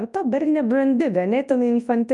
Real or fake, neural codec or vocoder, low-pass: fake; codec, 24 kHz, 0.9 kbps, WavTokenizer, large speech release; 10.8 kHz